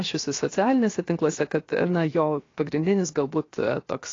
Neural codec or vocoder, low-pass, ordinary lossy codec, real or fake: codec, 16 kHz, 0.7 kbps, FocalCodec; 7.2 kHz; AAC, 32 kbps; fake